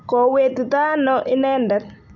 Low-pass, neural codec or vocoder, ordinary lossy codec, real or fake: 7.2 kHz; none; none; real